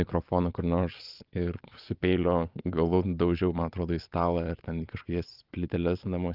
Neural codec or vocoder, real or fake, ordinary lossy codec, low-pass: vocoder, 22.05 kHz, 80 mel bands, Vocos; fake; Opus, 24 kbps; 5.4 kHz